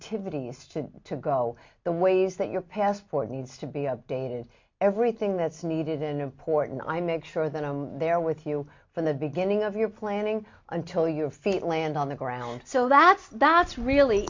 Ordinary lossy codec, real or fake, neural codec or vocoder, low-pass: MP3, 48 kbps; real; none; 7.2 kHz